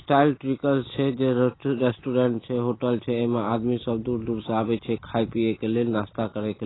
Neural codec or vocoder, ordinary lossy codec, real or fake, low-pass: none; AAC, 16 kbps; real; 7.2 kHz